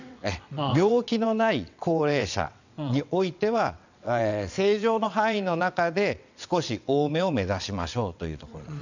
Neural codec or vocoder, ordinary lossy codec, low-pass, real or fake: vocoder, 22.05 kHz, 80 mel bands, WaveNeXt; none; 7.2 kHz; fake